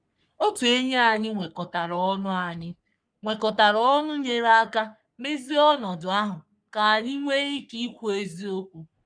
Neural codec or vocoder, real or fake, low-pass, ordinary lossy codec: codec, 44.1 kHz, 3.4 kbps, Pupu-Codec; fake; 9.9 kHz; none